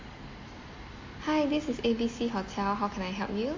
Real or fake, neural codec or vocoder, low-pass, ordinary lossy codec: real; none; 7.2 kHz; MP3, 32 kbps